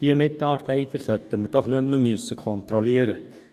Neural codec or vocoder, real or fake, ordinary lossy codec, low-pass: codec, 44.1 kHz, 2.6 kbps, DAC; fake; none; 14.4 kHz